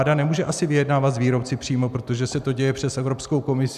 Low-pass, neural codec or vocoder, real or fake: 14.4 kHz; none; real